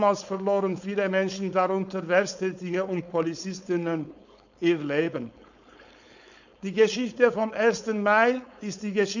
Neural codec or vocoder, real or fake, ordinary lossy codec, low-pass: codec, 16 kHz, 4.8 kbps, FACodec; fake; none; 7.2 kHz